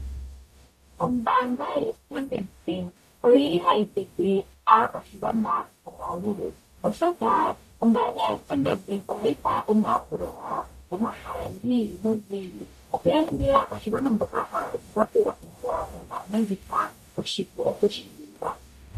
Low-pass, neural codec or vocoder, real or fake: 14.4 kHz; codec, 44.1 kHz, 0.9 kbps, DAC; fake